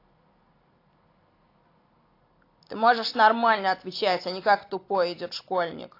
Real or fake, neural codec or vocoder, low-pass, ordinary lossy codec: real; none; 5.4 kHz; AAC, 32 kbps